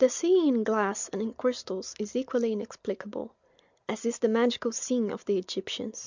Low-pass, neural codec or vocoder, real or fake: 7.2 kHz; none; real